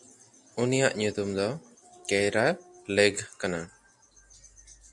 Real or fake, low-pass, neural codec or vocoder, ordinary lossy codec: real; 10.8 kHz; none; MP3, 64 kbps